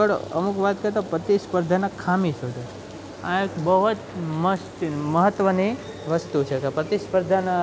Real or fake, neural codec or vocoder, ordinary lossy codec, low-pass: real; none; none; none